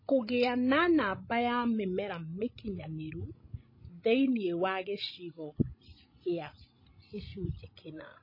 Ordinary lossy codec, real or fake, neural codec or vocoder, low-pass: MP3, 24 kbps; fake; codec, 16 kHz, 16 kbps, FreqCodec, larger model; 5.4 kHz